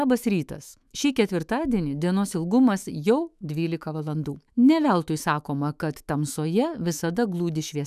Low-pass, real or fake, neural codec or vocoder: 14.4 kHz; fake; autoencoder, 48 kHz, 128 numbers a frame, DAC-VAE, trained on Japanese speech